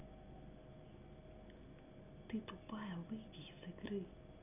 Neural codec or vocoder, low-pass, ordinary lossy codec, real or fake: none; 3.6 kHz; none; real